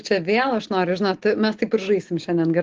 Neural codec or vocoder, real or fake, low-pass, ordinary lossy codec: none; real; 7.2 kHz; Opus, 16 kbps